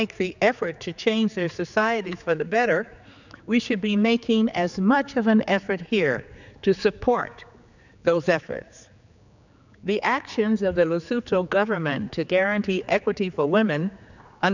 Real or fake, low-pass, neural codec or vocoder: fake; 7.2 kHz; codec, 16 kHz, 4 kbps, X-Codec, HuBERT features, trained on general audio